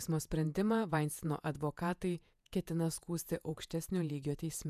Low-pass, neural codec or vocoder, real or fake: 14.4 kHz; vocoder, 48 kHz, 128 mel bands, Vocos; fake